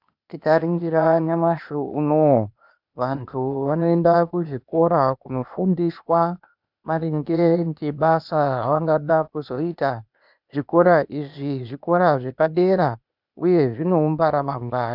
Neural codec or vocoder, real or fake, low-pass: codec, 16 kHz, 0.8 kbps, ZipCodec; fake; 5.4 kHz